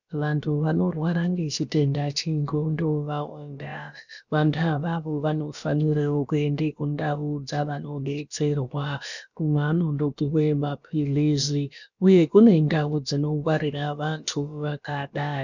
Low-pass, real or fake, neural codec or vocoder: 7.2 kHz; fake; codec, 16 kHz, about 1 kbps, DyCAST, with the encoder's durations